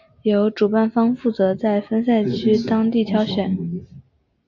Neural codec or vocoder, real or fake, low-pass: none; real; 7.2 kHz